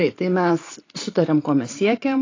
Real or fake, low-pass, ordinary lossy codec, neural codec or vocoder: fake; 7.2 kHz; AAC, 32 kbps; vocoder, 44.1 kHz, 128 mel bands every 512 samples, BigVGAN v2